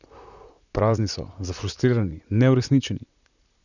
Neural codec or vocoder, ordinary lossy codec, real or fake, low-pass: vocoder, 44.1 kHz, 128 mel bands, Pupu-Vocoder; none; fake; 7.2 kHz